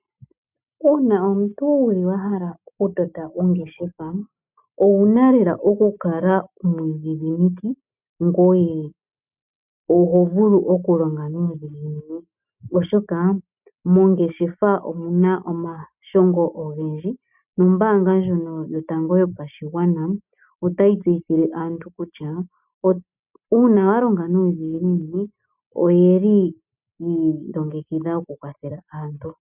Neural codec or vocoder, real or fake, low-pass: none; real; 3.6 kHz